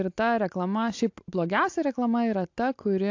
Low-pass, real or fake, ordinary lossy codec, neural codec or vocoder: 7.2 kHz; real; AAC, 48 kbps; none